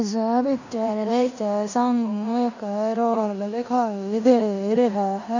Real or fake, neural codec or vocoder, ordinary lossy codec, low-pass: fake; codec, 16 kHz in and 24 kHz out, 0.9 kbps, LongCat-Audio-Codec, fine tuned four codebook decoder; none; 7.2 kHz